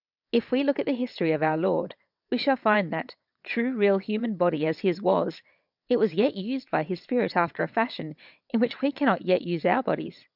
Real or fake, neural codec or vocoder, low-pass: fake; vocoder, 22.05 kHz, 80 mel bands, WaveNeXt; 5.4 kHz